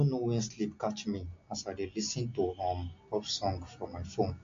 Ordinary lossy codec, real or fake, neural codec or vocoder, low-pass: AAC, 48 kbps; real; none; 7.2 kHz